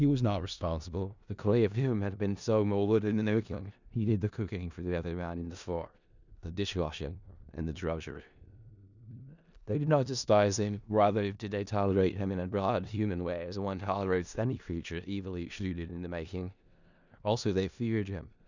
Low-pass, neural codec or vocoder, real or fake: 7.2 kHz; codec, 16 kHz in and 24 kHz out, 0.4 kbps, LongCat-Audio-Codec, four codebook decoder; fake